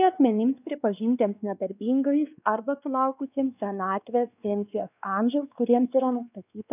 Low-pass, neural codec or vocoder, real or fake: 3.6 kHz; codec, 16 kHz, 2 kbps, X-Codec, WavLM features, trained on Multilingual LibriSpeech; fake